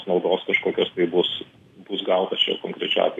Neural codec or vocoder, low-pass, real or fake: none; 14.4 kHz; real